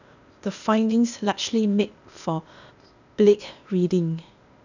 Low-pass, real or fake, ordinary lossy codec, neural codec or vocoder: 7.2 kHz; fake; none; codec, 16 kHz, 0.8 kbps, ZipCodec